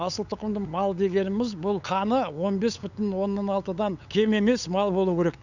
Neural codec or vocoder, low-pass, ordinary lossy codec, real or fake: codec, 16 kHz, 8 kbps, FunCodec, trained on LibriTTS, 25 frames a second; 7.2 kHz; none; fake